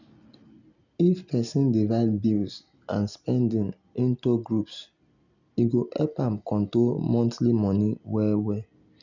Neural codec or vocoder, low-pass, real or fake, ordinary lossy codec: none; 7.2 kHz; real; none